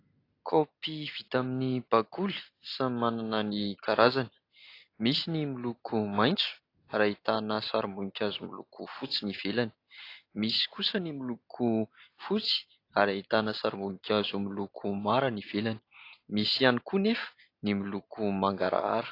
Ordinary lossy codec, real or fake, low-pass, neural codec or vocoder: AAC, 32 kbps; real; 5.4 kHz; none